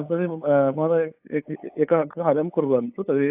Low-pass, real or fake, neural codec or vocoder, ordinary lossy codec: 3.6 kHz; fake; codec, 16 kHz, 8 kbps, FunCodec, trained on LibriTTS, 25 frames a second; AAC, 32 kbps